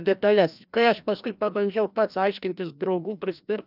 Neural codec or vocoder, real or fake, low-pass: codec, 16 kHz, 1 kbps, FreqCodec, larger model; fake; 5.4 kHz